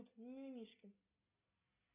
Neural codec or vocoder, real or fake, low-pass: none; real; 3.6 kHz